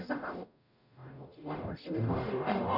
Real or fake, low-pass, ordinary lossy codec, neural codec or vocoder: fake; 5.4 kHz; none; codec, 44.1 kHz, 0.9 kbps, DAC